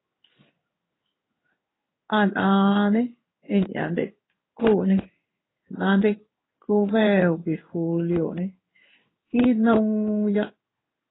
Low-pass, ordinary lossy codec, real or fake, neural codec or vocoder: 7.2 kHz; AAC, 16 kbps; fake; codec, 16 kHz in and 24 kHz out, 1 kbps, XY-Tokenizer